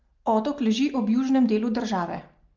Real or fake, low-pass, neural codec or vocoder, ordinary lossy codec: real; 7.2 kHz; none; Opus, 32 kbps